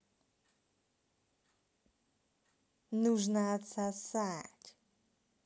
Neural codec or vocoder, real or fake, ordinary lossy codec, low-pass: none; real; none; none